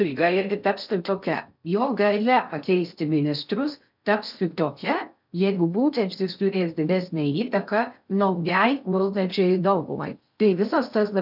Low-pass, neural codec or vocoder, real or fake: 5.4 kHz; codec, 16 kHz in and 24 kHz out, 0.6 kbps, FocalCodec, streaming, 4096 codes; fake